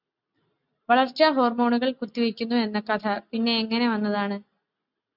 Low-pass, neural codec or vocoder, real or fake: 5.4 kHz; none; real